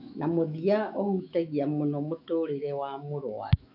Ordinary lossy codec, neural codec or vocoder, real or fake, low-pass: none; autoencoder, 48 kHz, 128 numbers a frame, DAC-VAE, trained on Japanese speech; fake; 5.4 kHz